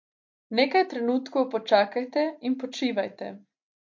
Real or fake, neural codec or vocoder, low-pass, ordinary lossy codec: real; none; 7.2 kHz; MP3, 48 kbps